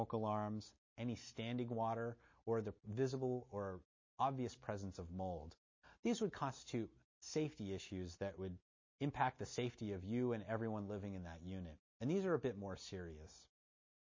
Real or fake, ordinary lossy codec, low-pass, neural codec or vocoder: real; MP3, 32 kbps; 7.2 kHz; none